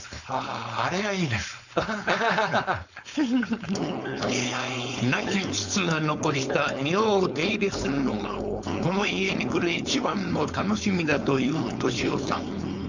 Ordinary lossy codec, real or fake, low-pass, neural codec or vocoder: none; fake; 7.2 kHz; codec, 16 kHz, 4.8 kbps, FACodec